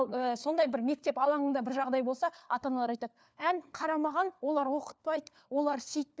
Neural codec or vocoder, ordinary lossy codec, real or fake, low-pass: codec, 16 kHz, 4 kbps, FunCodec, trained on LibriTTS, 50 frames a second; none; fake; none